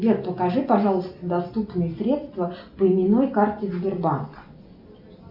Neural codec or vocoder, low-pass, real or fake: none; 5.4 kHz; real